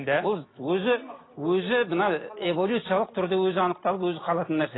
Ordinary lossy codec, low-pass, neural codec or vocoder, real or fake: AAC, 16 kbps; 7.2 kHz; none; real